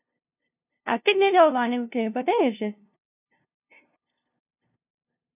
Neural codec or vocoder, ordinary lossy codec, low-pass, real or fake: codec, 16 kHz, 0.5 kbps, FunCodec, trained on LibriTTS, 25 frames a second; none; 3.6 kHz; fake